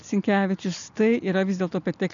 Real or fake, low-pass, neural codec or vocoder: real; 7.2 kHz; none